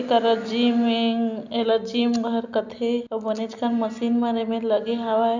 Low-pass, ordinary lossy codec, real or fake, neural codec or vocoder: 7.2 kHz; none; real; none